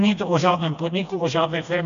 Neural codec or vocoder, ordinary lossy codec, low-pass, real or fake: codec, 16 kHz, 1 kbps, FreqCodec, smaller model; MP3, 96 kbps; 7.2 kHz; fake